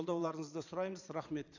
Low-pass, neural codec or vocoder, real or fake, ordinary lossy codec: 7.2 kHz; vocoder, 22.05 kHz, 80 mel bands, Vocos; fake; none